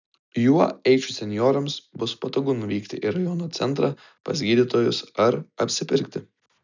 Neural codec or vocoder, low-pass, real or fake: none; 7.2 kHz; real